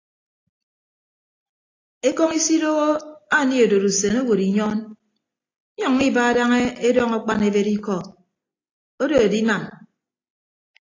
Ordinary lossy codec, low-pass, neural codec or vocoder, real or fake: AAC, 32 kbps; 7.2 kHz; none; real